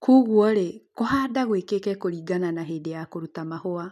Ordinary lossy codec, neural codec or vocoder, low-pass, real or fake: none; none; 14.4 kHz; real